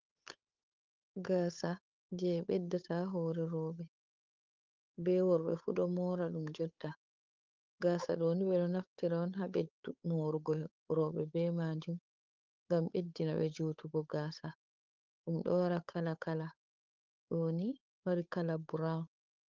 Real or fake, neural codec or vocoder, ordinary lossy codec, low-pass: fake; codec, 16 kHz, 8 kbps, FunCodec, trained on Chinese and English, 25 frames a second; Opus, 24 kbps; 7.2 kHz